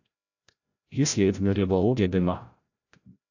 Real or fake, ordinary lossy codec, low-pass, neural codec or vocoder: fake; AAC, 48 kbps; 7.2 kHz; codec, 16 kHz, 0.5 kbps, FreqCodec, larger model